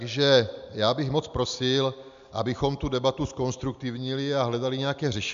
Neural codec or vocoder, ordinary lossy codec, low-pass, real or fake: none; MP3, 64 kbps; 7.2 kHz; real